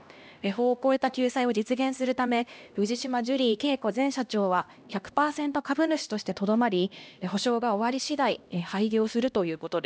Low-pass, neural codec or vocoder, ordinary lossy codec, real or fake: none; codec, 16 kHz, 1 kbps, X-Codec, HuBERT features, trained on LibriSpeech; none; fake